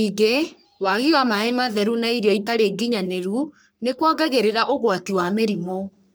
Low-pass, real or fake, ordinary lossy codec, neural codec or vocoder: none; fake; none; codec, 44.1 kHz, 3.4 kbps, Pupu-Codec